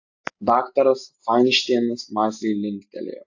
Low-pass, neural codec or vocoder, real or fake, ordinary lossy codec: 7.2 kHz; none; real; AAC, 48 kbps